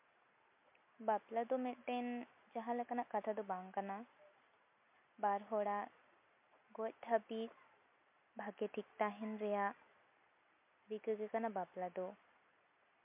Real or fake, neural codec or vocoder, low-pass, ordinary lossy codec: real; none; 3.6 kHz; MP3, 32 kbps